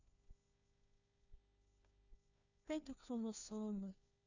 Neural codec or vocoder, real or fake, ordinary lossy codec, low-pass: codec, 16 kHz, 1 kbps, FunCodec, trained on LibriTTS, 50 frames a second; fake; none; 7.2 kHz